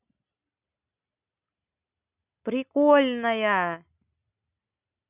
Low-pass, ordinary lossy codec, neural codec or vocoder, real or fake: 3.6 kHz; none; none; real